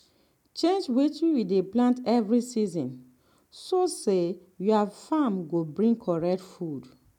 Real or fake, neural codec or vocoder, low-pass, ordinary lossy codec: real; none; 19.8 kHz; none